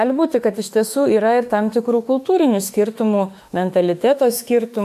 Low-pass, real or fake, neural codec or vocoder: 14.4 kHz; fake; autoencoder, 48 kHz, 32 numbers a frame, DAC-VAE, trained on Japanese speech